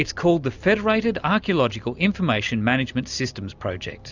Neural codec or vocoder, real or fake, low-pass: none; real; 7.2 kHz